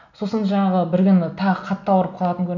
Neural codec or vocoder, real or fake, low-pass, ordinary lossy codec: none; real; 7.2 kHz; none